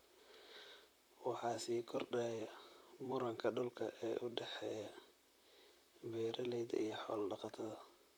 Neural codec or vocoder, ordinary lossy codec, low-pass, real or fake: vocoder, 44.1 kHz, 128 mel bands, Pupu-Vocoder; none; none; fake